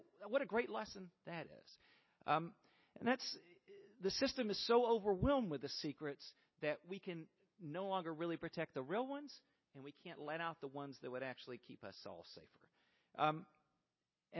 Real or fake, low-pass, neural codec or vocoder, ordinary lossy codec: real; 7.2 kHz; none; MP3, 24 kbps